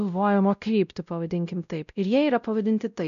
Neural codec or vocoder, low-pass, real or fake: codec, 16 kHz, 0.5 kbps, X-Codec, WavLM features, trained on Multilingual LibriSpeech; 7.2 kHz; fake